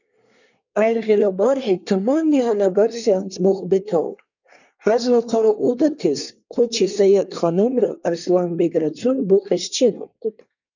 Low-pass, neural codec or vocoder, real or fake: 7.2 kHz; codec, 24 kHz, 1 kbps, SNAC; fake